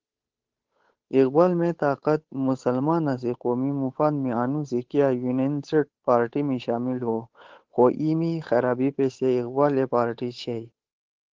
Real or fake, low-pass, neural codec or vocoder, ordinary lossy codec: fake; 7.2 kHz; codec, 16 kHz, 8 kbps, FunCodec, trained on Chinese and English, 25 frames a second; Opus, 16 kbps